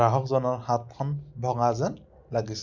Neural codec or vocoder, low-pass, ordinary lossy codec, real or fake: none; 7.2 kHz; none; real